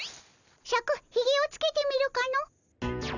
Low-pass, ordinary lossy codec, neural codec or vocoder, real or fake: 7.2 kHz; none; none; real